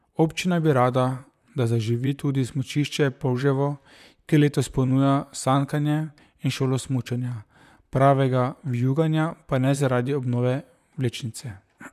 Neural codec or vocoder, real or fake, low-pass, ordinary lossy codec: vocoder, 44.1 kHz, 128 mel bands, Pupu-Vocoder; fake; 14.4 kHz; none